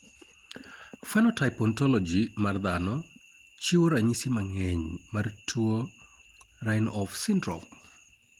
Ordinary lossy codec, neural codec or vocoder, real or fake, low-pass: Opus, 24 kbps; none; real; 19.8 kHz